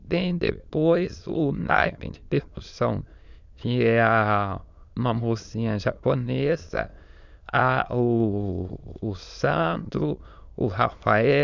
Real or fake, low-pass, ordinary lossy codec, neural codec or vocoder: fake; 7.2 kHz; none; autoencoder, 22.05 kHz, a latent of 192 numbers a frame, VITS, trained on many speakers